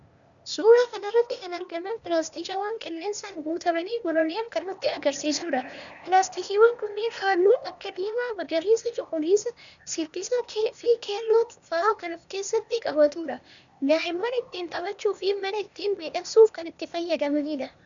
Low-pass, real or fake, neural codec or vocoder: 7.2 kHz; fake; codec, 16 kHz, 0.8 kbps, ZipCodec